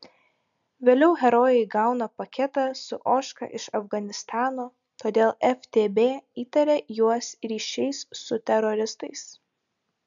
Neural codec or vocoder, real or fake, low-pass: none; real; 7.2 kHz